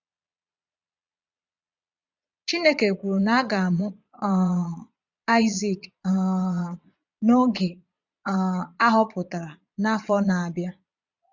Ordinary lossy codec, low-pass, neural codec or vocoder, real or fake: none; 7.2 kHz; vocoder, 22.05 kHz, 80 mel bands, Vocos; fake